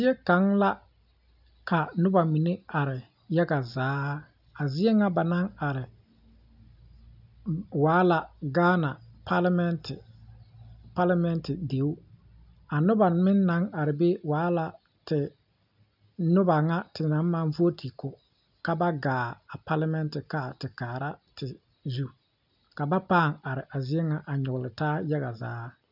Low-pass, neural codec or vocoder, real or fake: 5.4 kHz; none; real